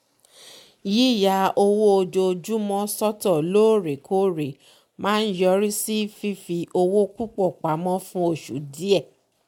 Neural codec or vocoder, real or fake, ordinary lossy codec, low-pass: none; real; MP3, 96 kbps; 19.8 kHz